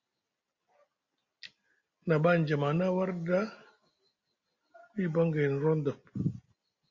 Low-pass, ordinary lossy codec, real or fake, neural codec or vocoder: 7.2 kHz; Opus, 64 kbps; real; none